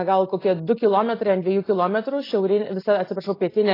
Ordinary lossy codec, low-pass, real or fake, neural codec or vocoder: AAC, 24 kbps; 5.4 kHz; real; none